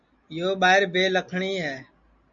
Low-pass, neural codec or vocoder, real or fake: 7.2 kHz; none; real